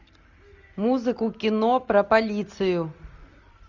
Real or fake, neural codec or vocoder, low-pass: real; none; 7.2 kHz